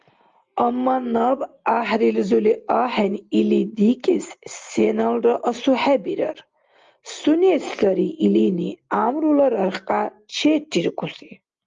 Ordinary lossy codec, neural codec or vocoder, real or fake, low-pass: Opus, 32 kbps; none; real; 7.2 kHz